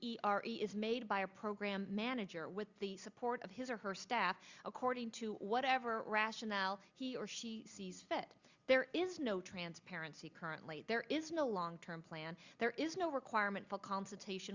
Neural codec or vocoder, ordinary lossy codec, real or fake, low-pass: none; Opus, 64 kbps; real; 7.2 kHz